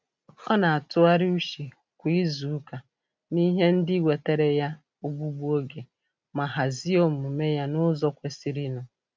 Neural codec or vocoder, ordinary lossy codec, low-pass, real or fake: none; none; none; real